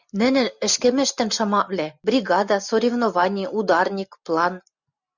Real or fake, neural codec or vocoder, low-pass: real; none; 7.2 kHz